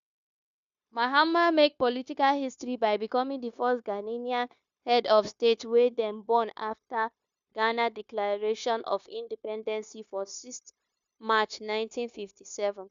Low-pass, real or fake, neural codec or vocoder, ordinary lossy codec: 7.2 kHz; fake; codec, 16 kHz, 0.9 kbps, LongCat-Audio-Codec; none